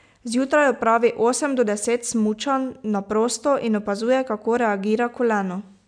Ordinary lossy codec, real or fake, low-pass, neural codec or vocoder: none; real; 9.9 kHz; none